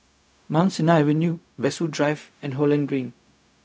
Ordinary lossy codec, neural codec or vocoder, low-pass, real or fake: none; codec, 16 kHz, 0.4 kbps, LongCat-Audio-Codec; none; fake